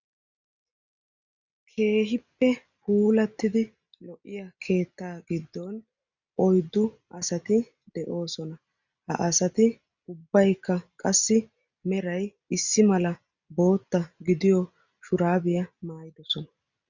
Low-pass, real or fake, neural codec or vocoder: 7.2 kHz; real; none